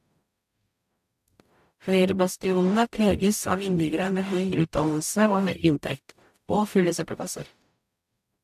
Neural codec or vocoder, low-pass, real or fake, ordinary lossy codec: codec, 44.1 kHz, 0.9 kbps, DAC; 14.4 kHz; fake; AAC, 96 kbps